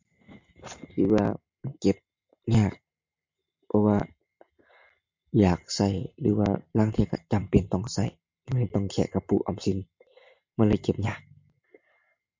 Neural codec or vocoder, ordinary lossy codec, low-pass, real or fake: none; MP3, 48 kbps; 7.2 kHz; real